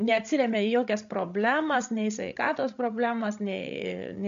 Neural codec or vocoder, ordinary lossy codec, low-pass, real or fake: codec, 16 kHz, 16 kbps, FreqCodec, larger model; MP3, 64 kbps; 7.2 kHz; fake